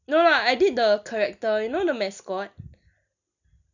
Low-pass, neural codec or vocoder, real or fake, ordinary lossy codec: 7.2 kHz; none; real; none